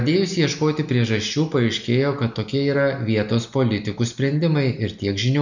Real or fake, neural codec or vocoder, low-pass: real; none; 7.2 kHz